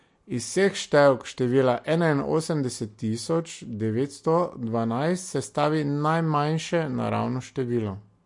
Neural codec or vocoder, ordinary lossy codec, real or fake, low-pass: none; MP3, 48 kbps; real; 10.8 kHz